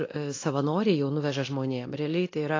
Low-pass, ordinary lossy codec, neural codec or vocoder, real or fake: 7.2 kHz; AAC, 32 kbps; codec, 24 kHz, 0.9 kbps, DualCodec; fake